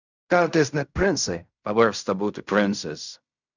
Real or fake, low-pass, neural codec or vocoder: fake; 7.2 kHz; codec, 16 kHz in and 24 kHz out, 0.4 kbps, LongCat-Audio-Codec, fine tuned four codebook decoder